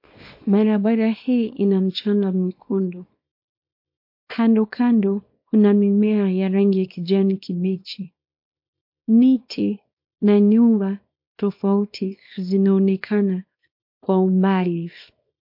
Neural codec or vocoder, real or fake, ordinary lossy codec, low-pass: codec, 24 kHz, 0.9 kbps, WavTokenizer, small release; fake; MP3, 32 kbps; 5.4 kHz